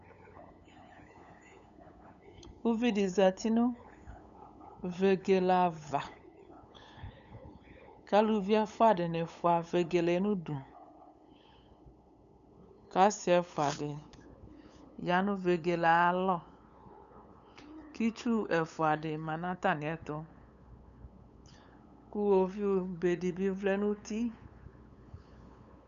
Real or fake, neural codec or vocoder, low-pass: fake; codec, 16 kHz, 8 kbps, FunCodec, trained on LibriTTS, 25 frames a second; 7.2 kHz